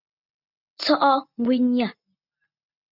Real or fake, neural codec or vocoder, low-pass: real; none; 5.4 kHz